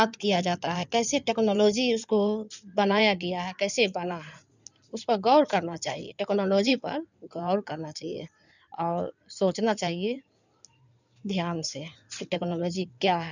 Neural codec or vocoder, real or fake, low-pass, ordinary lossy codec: codec, 16 kHz in and 24 kHz out, 2.2 kbps, FireRedTTS-2 codec; fake; 7.2 kHz; none